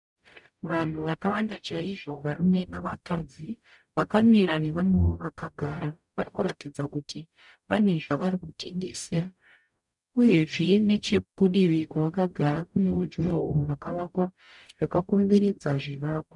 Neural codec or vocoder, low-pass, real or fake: codec, 44.1 kHz, 0.9 kbps, DAC; 10.8 kHz; fake